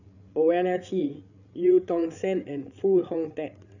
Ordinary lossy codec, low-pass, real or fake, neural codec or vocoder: none; 7.2 kHz; fake; codec, 16 kHz, 8 kbps, FreqCodec, larger model